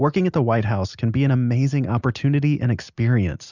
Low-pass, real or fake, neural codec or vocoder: 7.2 kHz; real; none